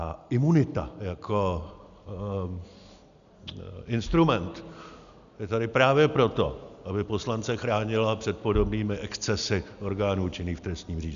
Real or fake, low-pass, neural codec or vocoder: real; 7.2 kHz; none